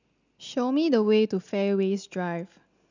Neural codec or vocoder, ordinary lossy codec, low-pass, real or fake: none; none; 7.2 kHz; real